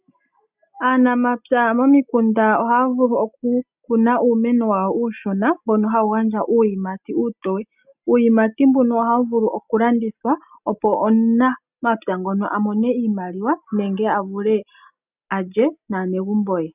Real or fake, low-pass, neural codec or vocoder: real; 3.6 kHz; none